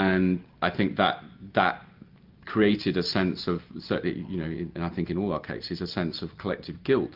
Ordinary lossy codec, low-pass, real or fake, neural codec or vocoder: Opus, 24 kbps; 5.4 kHz; real; none